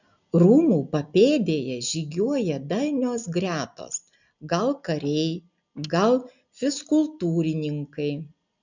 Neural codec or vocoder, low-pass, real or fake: none; 7.2 kHz; real